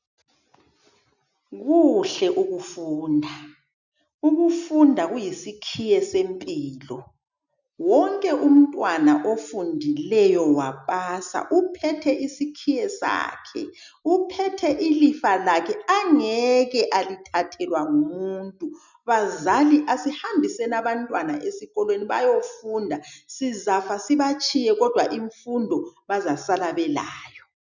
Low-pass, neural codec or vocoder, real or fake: 7.2 kHz; none; real